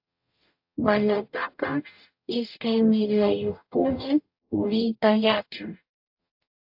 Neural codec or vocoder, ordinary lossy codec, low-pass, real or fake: codec, 44.1 kHz, 0.9 kbps, DAC; AAC, 48 kbps; 5.4 kHz; fake